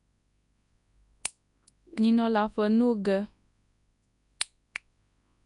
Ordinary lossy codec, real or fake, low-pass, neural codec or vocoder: none; fake; 10.8 kHz; codec, 24 kHz, 0.9 kbps, WavTokenizer, large speech release